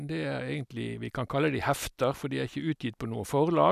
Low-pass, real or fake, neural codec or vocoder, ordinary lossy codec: 14.4 kHz; real; none; none